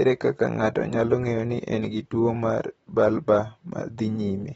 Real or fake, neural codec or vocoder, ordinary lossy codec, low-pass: fake; vocoder, 44.1 kHz, 128 mel bands, Pupu-Vocoder; AAC, 24 kbps; 19.8 kHz